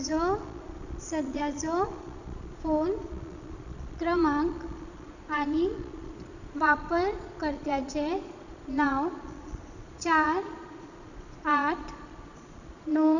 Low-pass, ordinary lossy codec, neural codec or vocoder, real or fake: 7.2 kHz; none; vocoder, 22.05 kHz, 80 mel bands, Vocos; fake